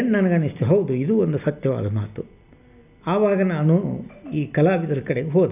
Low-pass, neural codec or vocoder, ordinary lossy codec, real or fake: 3.6 kHz; none; none; real